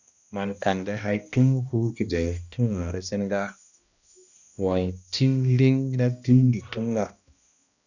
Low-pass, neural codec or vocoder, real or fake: 7.2 kHz; codec, 16 kHz, 1 kbps, X-Codec, HuBERT features, trained on balanced general audio; fake